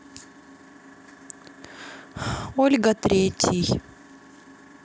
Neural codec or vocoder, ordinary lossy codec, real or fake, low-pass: none; none; real; none